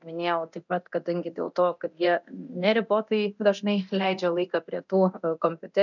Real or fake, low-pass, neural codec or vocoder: fake; 7.2 kHz; codec, 24 kHz, 0.9 kbps, DualCodec